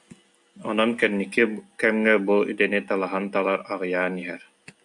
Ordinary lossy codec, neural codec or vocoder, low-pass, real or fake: AAC, 64 kbps; none; 10.8 kHz; real